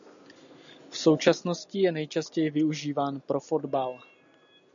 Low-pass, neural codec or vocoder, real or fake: 7.2 kHz; none; real